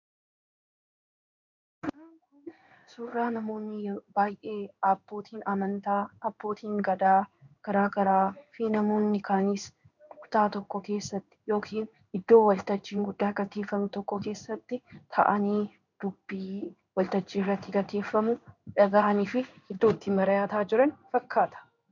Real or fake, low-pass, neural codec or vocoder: fake; 7.2 kHz; codec, 16 kHz in and 24 kHz out, 1 kbps, XY-Tokenizer